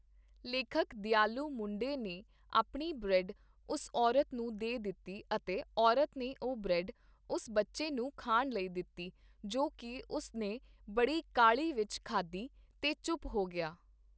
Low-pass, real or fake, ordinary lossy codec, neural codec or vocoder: none; real; none; none